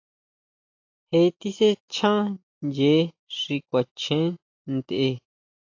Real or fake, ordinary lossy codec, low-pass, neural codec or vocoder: real; AAC, 48 kbps; 7.2 kHz; none